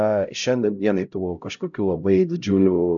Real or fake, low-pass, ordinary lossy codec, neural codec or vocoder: fake; 7.2 kHz; MP3, 96 kbps; codec, 16 kHz, 0.5 kbps, X-Codec, HuBERT features, trained on LibriSpeech